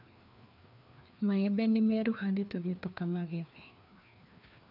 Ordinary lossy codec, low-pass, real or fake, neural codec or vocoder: none; 5.4 kHz; fake; codec, 16 kHz, 2 kbps, FreqCodec, larger model